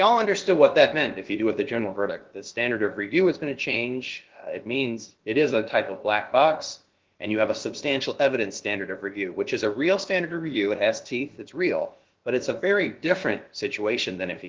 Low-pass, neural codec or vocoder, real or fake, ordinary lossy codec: 7.2 kHz; codec, 16 kHz, about 1 kbps, DyCAST, with the encoder's durations; fake; Opus, 16 kbps